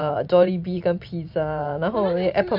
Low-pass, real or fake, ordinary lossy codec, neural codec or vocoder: 5.4 kHz; fake; none; vocoder, 44.1 kHz, 128 mel bands every 512 samples, BigVGAN v2